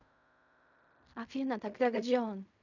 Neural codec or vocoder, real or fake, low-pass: codec, 16 kHz in and 24 kHz out, 0.4 kbps, LongCat-Audio-Codec, fine tuned four codebook decoder; fake; 7.2 kHz